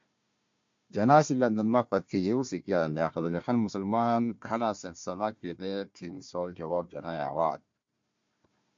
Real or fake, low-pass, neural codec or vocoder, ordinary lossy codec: fake; 7.2 kHz; codec, 16 kHz, 1 kbps, FunCodec, trained on Chinese and English, 50 frames a second; MP3, 48 kbps